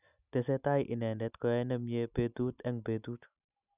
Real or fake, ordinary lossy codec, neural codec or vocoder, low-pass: real; none; none; 3.6 kHz